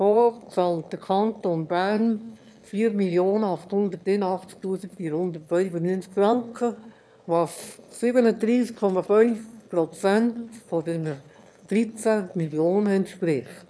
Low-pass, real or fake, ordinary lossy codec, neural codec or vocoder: none; fake; none; autoencoder, 22.05 kHz, a latent of 192 numbers a frame, VITS, trained on one speaker